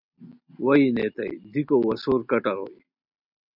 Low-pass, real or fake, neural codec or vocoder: 5.4 kHz; real; none